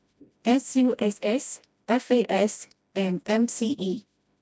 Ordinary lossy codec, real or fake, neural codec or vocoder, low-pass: none; fake; codec, 16 kHz, 1 kbps, FreqCodec, smaller model; none